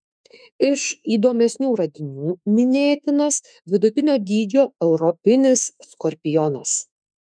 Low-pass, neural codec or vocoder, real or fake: 9.9 kHz; autoencoder, 48 kHz, 32 numbers a frame, DAC-VAE, trained on Japanese speech; fake